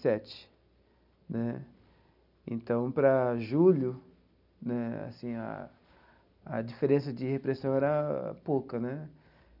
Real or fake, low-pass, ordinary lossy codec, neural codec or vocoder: real; 5.4 kHz; none; none